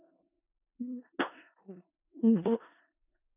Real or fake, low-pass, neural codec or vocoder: fake; 3.6 kHz; codec, 16 kHz in and 24 kHz out, 0.4 kbps, LongCat-Audio-Codec, four codebook decoder